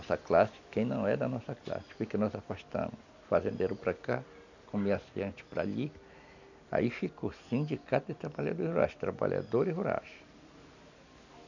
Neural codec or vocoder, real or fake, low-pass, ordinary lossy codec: none; real; 7.2 kHz; none